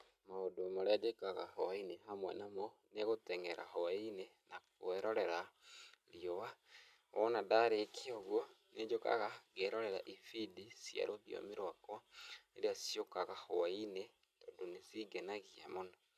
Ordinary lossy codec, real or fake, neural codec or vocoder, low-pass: none; real; none; 14.4 kHz